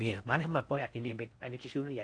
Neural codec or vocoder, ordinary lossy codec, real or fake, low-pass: codec, 16 kHz in and 24 kHz out, 0.6 kbps, FocalCodec, streaming, 4096 codes; AAC, 48 kbps; fake; 9.9 kHz